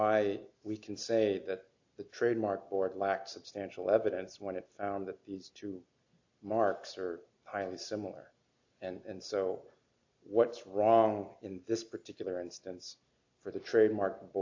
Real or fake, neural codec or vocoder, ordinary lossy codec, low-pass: real; none; AAC, 48 kbps; 7.2 kHz